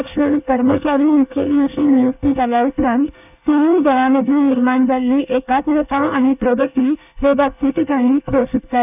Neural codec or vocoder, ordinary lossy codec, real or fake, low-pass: codec, 24 kHz, 1 kbps, SNAC; none; fake; 3.6 kHz